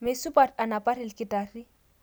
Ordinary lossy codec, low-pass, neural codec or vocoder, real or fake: none; none; none; real